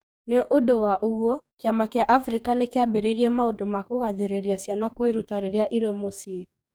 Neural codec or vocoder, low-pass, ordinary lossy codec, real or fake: codec, 44.1 kHz, 2.6 kbps, DAC; none; none; fake